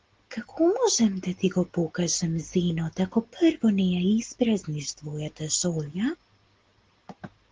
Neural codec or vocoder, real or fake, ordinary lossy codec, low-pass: none; real; Opus, 16 kbps; 7.2 kHz